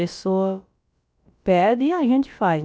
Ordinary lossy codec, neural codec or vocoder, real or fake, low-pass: none; codec, 16 kHz, about 1 kbps, DyCAST, with the encoder's durations; fake; none